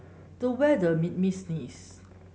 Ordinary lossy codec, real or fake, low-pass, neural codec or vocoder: none; real; none; none